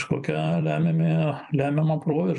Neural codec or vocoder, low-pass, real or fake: vocoder, 44.1 kHz, 128 mel bands every 256 samples, BigVGAN v2; 10.8 kHz; fake